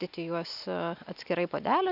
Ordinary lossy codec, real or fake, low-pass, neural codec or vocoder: AAC, 48 kbps; real; 5.4 kHz; none